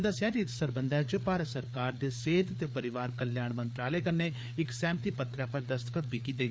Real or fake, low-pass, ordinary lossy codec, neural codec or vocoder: fake; none; none; codec, 16 kHz, 4 kbps, FreqCodec, larger model